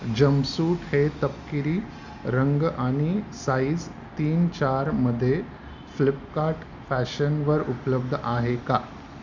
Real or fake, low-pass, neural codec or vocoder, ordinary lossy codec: real; 7.2 kHz; none; none